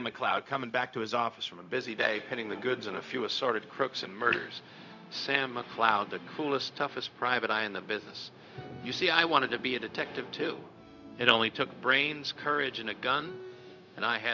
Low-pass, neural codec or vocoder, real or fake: 7.2 kHz; codec, 16 kHz, 0.4 kbps, LongCat-Audio-Codec; fake